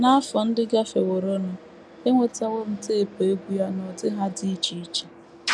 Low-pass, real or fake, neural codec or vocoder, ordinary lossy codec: none; real; none; none